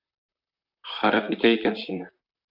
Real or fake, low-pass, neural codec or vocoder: fake; 5.4 kHz; vocoder, 44.1 kHz, 128 mel bands, Pupu-Vocoder